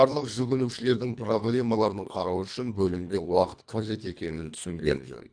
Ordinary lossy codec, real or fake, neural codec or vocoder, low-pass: none; fake; codec, 24 kHz, 1.5 kbps, HILCodec; 9.9 kHz